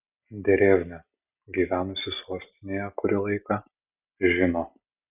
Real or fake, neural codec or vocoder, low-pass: real; none; 3.6 kHz